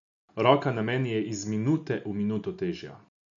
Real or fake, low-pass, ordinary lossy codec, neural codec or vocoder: real; 7.2 kHz; none; none